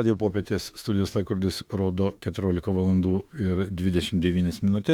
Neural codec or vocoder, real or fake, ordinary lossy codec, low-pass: autoencoder, 48 kHz, 32 numbers a frame, DAC-VAE, trained on Japanese speech; fake; Opus, 64 kbps; 19.8 kHz